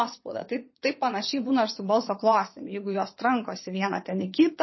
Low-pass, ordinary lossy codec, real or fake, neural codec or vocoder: 7.2 kHz; MP3, 24 kbps; fake; vocoder, 22.05 kHz, 80 mel bands, WaveNeXt